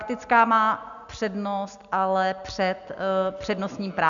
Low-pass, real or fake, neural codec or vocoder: 7.2 kHz; real; none